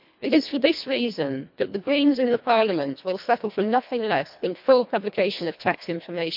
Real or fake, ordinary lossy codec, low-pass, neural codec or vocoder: fake; MP3, 48 kbps; 5.4 kHz; codec, 24 kHz, 1.5 kbps, HILCodec